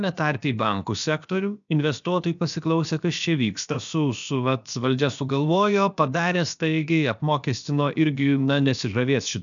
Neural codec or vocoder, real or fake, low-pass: codec, 16 kHz, about 1 kbps, DyCAST, with the encoder's durations; fake; 7.2 kHz